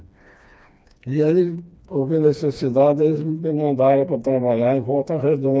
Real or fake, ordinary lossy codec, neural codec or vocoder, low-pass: fake; none; codec, 16 kHz, 2 kbps, FreqCodec, smaller model; none